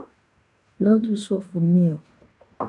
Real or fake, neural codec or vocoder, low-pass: fake; codec, 16 kHz in and 24 kHz out, 0.9 kbps, LongCat-Audio-Codec, fine tuned four codebook decoder; 10.8 kHz